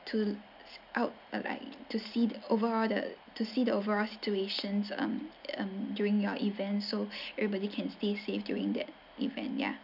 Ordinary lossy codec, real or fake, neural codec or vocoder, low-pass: none; real; none; 5.4 kHz